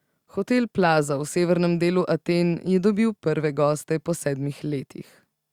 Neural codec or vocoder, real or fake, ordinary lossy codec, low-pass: none; real; Opus, 64 kbps; 19.8 kHz